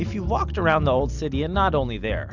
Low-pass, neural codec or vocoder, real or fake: 7.2 kHz; none; real